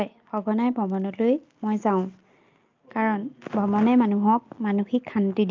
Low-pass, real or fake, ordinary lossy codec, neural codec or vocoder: 7.2 kHz; real; Opus, 24 kbps; none